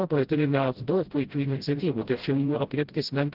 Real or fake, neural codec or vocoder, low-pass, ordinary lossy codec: fake; codec, 16 kHz, 0.5 kbps, FreqCodec, smaller model; 5.4 kHz; Opus, 16 kbps